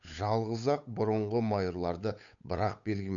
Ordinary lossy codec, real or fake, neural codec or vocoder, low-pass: none; fake; codec, 16 kHz, 8 kbps, FunCodec, trained on Chinese and English, 25 frames a second; 7.2 kHz